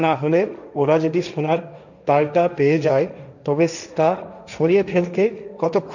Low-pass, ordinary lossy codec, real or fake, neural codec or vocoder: 7.2 kHz; none; fake; codec, 16 kHz, 1.1 kbps, Voila-Tokenizer